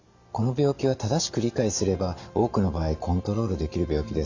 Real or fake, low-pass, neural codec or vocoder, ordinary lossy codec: real; 7.2 kHz; none; Opus, 64 kbps